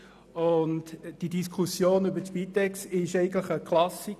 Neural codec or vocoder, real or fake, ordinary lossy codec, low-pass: none; real; none; 14.4 kHz